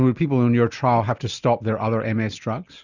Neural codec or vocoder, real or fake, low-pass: none; real; 7.2 kHz